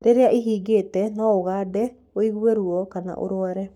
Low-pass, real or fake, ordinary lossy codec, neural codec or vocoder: 19.8 kHz; fake; none; codec, 44.1 kHz, 7.8 kbps, Pupu-Codec